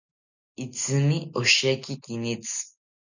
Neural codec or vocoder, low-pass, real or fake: none; 7.2 kHz; real